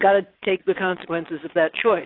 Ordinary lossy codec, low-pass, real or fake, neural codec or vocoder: AAC, 32 kbps; 5.4 kHz; fake; vocoder, 22.05 kHz, 80 mel bands, Vocos